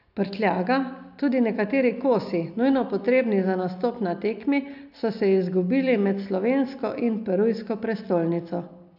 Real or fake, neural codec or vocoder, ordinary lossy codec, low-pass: real; none; none; 5.4 kHz